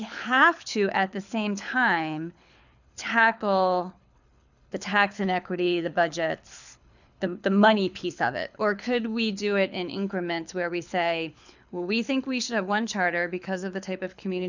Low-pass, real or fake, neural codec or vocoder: 7.2 kHz; fake; codec, 24 kHz, 6 kbps, HILCodec